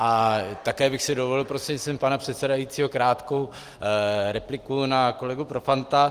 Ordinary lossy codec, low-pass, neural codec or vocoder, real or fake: Opus, 24 kbps; 14.4 kHz; none; real